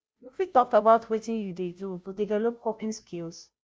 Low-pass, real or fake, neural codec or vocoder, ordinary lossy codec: none; fake; codec, 16 kHz, 0.5 kbps, FunCodec, trained on Chinese and English, 25 frames a second; none